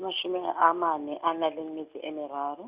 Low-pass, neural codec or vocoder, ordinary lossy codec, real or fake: 3.6 kHz; none; Opus, 64 kbps; real